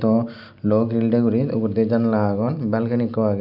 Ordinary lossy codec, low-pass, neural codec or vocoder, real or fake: none; 5.4 kHz; none; real